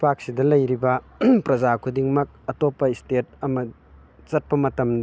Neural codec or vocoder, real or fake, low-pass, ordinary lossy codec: none; real; none; none